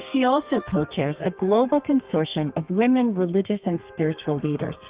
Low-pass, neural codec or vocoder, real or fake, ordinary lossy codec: 3.6 kHz; codec, 32 kHz, 1.9 kbps, SNAC; fake; Opus, 24 kbps